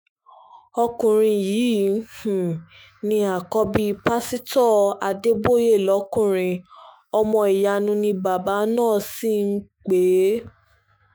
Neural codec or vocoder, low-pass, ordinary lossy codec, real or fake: autoencoder, 48 kHz, 128 numbers a frame, DAC-VAE, trained on Japanese speech; none; none; fake